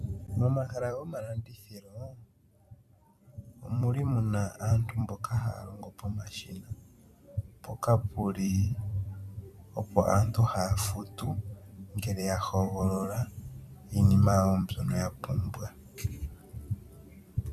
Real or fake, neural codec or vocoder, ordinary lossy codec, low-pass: fake; vocoder, 44.1 kHz, 128 mel bands every 256 samples, BigVGAN v2; MP3, 96 kbps; 14.4 kHz